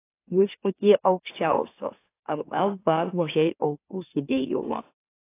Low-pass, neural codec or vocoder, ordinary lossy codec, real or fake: 3.6 kHz; autoencoder, 44.1 kHz, a latent of 192 numbers a frame, MeloTTS; AAC, 24 kbps; fake